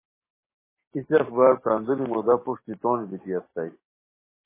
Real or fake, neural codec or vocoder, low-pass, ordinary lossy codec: fake; codec, 44.1 kHz, 7.8 kbps, DAC; 3.6 kHz; MP3, 16 kbps